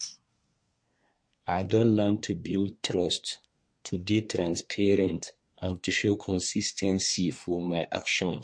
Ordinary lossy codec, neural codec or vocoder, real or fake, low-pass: MP3, 48 kbps; codec, 24 kHz, 1 kbps, SNAC; fake; 9.9 kHz